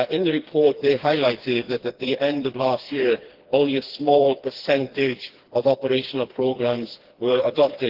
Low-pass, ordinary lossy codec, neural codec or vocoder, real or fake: 5.4 kHz; Opus, 16 kbps; codec, 16 kHz, 2 kbps, FreqCodec, smaller model; fake